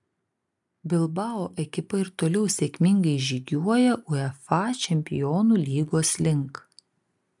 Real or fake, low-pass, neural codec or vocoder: real; 10.8 kHz; none